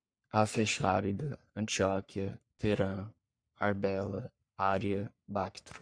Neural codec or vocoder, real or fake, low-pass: codec, 44.1 kHz, 3.4 kbps, Pupu-Codec; fake; 9.9 kHz